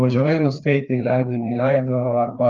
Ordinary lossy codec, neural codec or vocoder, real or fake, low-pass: Opus, 32 kbps; codec, 16 kHz, 2 kbps, FreqCodec, larger model; fake; 7.2 kHz